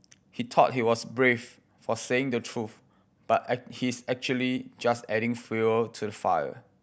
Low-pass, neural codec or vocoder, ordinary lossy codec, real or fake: none; none; none; real